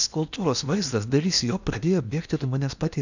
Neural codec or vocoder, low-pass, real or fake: codec, 16 kHz in and 24 kHz out, 0.8 kbps, FocalCodec, streaming, 65536 codes; 7.2 kHz; fake